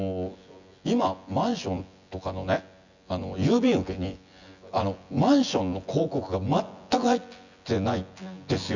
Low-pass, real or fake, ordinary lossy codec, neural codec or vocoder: 7.2 kHz; fake; Opus, 64 kbps; vocoder, 24 kHz, 100 mel bands, Vocos